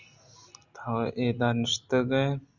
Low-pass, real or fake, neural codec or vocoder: 7.2 kHz; real; none